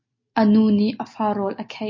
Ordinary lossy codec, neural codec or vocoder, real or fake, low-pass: MP3, 32 kbps; none; real; 7.2 kHz